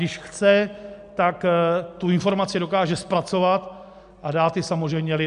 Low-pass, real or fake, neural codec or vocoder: 10.8 kHz; real; none